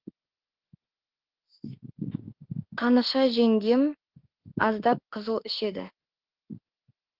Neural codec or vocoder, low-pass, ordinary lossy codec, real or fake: autoencoder, 48 kHz, 32 numbers a frame, DAC-VAE, trained on Japanese speech; 5.4 kHz; Opus, 16 kbps; fake